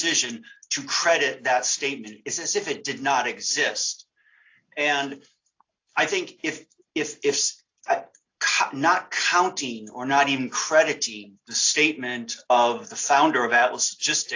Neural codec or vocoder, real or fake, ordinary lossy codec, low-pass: none; real; AAC, 48 kbps; 7.2 kHz